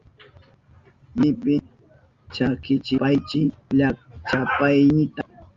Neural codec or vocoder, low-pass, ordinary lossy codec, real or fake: none; 7.2 kHz; Opus, 32 kbps; real